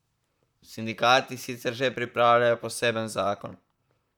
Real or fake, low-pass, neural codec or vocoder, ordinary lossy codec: fake; 19.8 kHz; vocoder, 44.1 kHz, 128 mel bands, Pupu-Vocoder; none